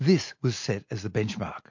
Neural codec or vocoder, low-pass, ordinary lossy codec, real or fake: none; 7.2 kHz; MP3, 48 kbps; real